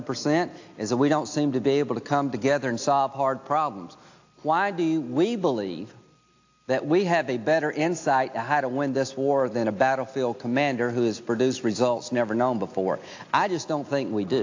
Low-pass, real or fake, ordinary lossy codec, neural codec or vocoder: 7.2 kHz; real; AAC, 48 kbps; none